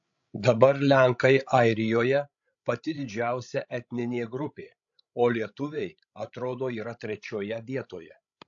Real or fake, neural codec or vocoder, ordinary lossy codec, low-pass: fake; codec, 16 kHz, 16 kbps, FreqCodec, larger model; AAC, 64 kbps; 7.2 kHz